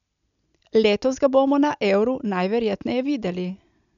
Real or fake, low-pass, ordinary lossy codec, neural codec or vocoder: real; 7.2 kHz; none; none